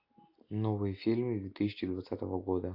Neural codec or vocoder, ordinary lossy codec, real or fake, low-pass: none; AAC, 32 kbps; real; 5.4 kHz